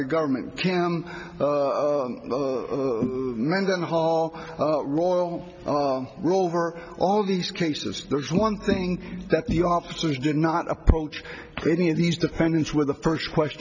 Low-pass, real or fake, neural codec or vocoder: 7.2 kHz; real; none